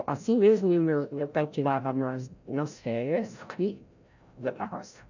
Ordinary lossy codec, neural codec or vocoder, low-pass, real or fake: none; codec, 16 kHz, 0.5 kbps, FreqCodec, larger model; 7.2 kHz; fake